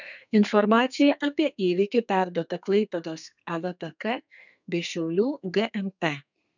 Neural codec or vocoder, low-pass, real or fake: codec, 32 kHz, 1.9 kbps, SNAC; 7.2 kHz; fake